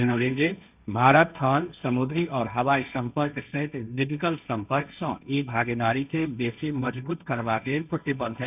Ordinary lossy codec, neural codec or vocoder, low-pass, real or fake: none; codec, 16 kHz, 1.1 kbps, Voila-Tokenizer; 3.6 kHz; fake